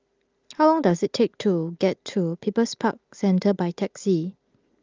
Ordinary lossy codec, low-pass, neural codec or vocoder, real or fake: Opus, 32 kbps; 7.2 kHz; none; real